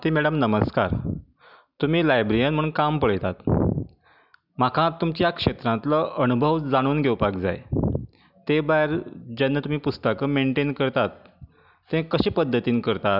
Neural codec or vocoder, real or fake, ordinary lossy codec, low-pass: none; real; none; 5.4 kHz